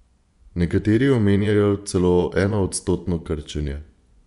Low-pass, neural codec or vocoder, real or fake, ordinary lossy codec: 10.8 kHz; vocoder, 24 kHz, 100 mel bands, Vocos; fake; none